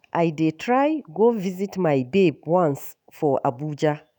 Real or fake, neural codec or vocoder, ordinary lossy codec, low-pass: fake; autoencoder, 48 kHz, 128 numbers a frame, DAC-VAE, trained on Japanese speech; none; none